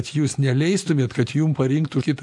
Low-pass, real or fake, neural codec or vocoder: 10.8 kHz; real; none